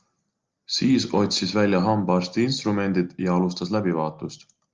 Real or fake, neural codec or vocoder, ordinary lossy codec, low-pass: real; none; Opus, 24 kbps; 7.2 kHz